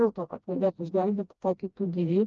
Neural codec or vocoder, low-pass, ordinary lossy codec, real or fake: codec, 16 kHz, 1 kbps, FreqCodec, smaller model; 7.2 kHz; Opus, 24 kbps; fake